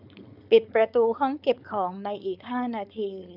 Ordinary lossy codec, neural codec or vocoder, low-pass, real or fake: none; codec, 16 kHz, 4.8 kbps, FACodec; 5.4 kHz; fake